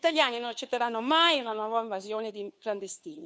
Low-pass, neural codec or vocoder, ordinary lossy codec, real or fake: none; codec, 16 kHz, 2 kbps, FunCodec, trained on Chinese and English, 25 frames a second; none; fake